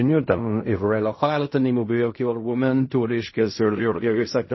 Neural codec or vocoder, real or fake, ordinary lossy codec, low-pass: codec, 16 kHz in and 24 kHz out, 0.4 kbps, LongCat-Audio-Codec, fine tuned four codebook decoder; fake; MP3, 24 kbps; 7.2 kHz